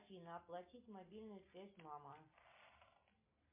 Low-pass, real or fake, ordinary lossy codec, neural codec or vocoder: 3.6 kHz; real; MP3, 32 kbps; none